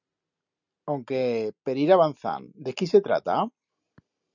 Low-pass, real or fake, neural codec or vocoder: 7.2 kHz; real; none